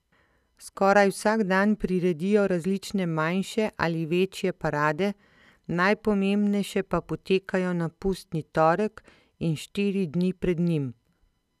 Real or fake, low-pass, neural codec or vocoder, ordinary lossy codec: real; 14.4 kHz; none; none